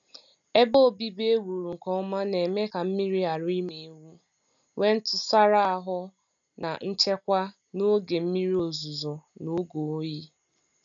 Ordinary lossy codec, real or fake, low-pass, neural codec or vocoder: none; real; 7.2 kHz; none